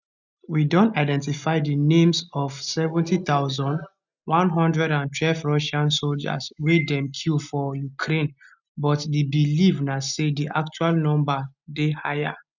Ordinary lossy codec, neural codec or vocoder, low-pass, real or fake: none; none; 7.2 kHz; real